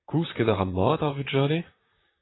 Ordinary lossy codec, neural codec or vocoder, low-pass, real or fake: AAC, 16 kbps; vocoder, 44.1 kHz, 128 mel bands, Pupu-Vocoder; 7.2 kHz; fake